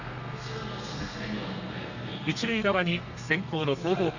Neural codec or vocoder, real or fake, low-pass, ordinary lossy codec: codec, 32 kHz, 1.9 kbps, SNAC; fake; 7.2 kHz; none